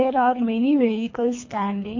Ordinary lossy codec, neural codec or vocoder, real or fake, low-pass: MP3, 48 kbps; codec, 24 kHz, 3 kbps, HILCodec; fake; 7.2 kHz